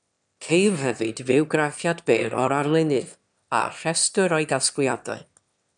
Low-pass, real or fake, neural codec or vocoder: 9.9 kHz; fake; autoencoder, 22.05 kHz, a latent of 192 numbers a frame, VITS, trained on one speaker